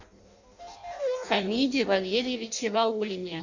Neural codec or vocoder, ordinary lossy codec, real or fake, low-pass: codec, 16 kHz in and 24 kHz out, 0.6 kbps, FireRedTTS-2 codec; Opus, 64 kbps; fake; 7.2 kHz